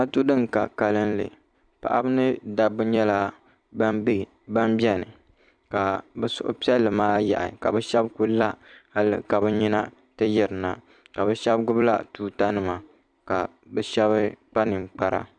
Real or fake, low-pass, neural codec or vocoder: fake; 9.9 kHz; vocoder, 44.1 kHz, 128 mel bands every 256 samples, BigVGAN v2